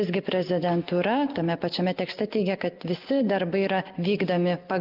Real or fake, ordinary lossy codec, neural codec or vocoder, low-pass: real; Opus, 32 kbps; none; 5.4 kHz